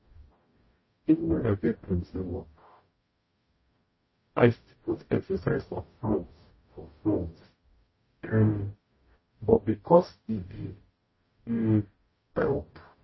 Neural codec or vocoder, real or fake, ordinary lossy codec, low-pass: codec, 44.1 kHz, 0.9 kbps, DAC; fake; MP3, 24 kbps; 7.2 kHz